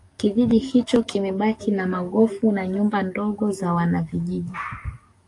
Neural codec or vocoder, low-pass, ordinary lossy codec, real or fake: codec, 44.1 kHz, 7.8 kbps, DAC; 10.8 kHz; AAC, 48 kbps; fake